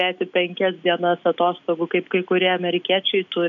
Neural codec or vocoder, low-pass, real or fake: none; 7.2 kHz; real